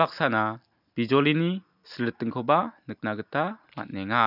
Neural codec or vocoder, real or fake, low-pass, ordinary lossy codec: none; real; 5.4 kHz; none